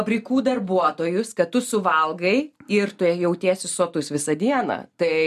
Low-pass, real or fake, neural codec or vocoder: 14.4 kHz; real; none